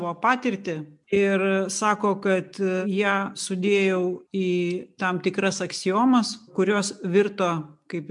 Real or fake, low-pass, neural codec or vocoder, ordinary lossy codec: real; 10.8 kHz; none; MP3, 96 kbps